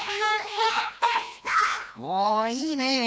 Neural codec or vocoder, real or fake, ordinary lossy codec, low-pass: codec, 16 kHz, 1 kbps, FreqCodec, larger model; fake; none; none